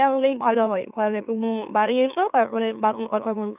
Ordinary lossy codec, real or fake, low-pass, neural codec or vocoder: none; fake; 3.6 kHz; autoencoder, 44.1 kHz, a latent of 192 numbers a frame, MeloTTS